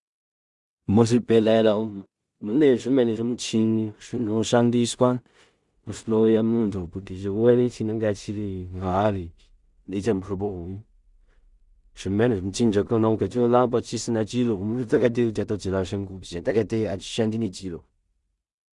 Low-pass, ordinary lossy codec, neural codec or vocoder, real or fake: 10.8 kHz; Opus, 64 kbps; codec, 16 kHz in and 24 kHz out, 0.4 kbps, LongCat-Audio-Codec, two codebook decoder; fake